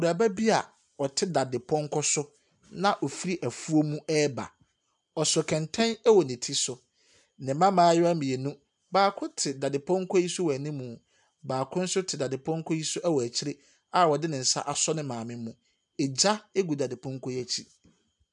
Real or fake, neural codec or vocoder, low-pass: real; none; 10.8 kHz